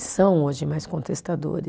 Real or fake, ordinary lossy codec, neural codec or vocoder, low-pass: real; none; none; none